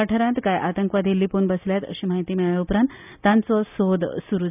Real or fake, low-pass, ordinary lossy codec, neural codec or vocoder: real; 3.6 kHz; none; none